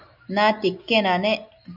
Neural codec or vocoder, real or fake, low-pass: none; real; 5.4 kHz